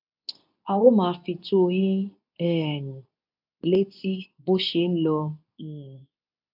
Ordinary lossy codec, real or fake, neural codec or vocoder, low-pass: none; fake; codec, 24 kHz, 0.9 kbps, WavTokenizer, medium speech release version 2; 5.4 kHz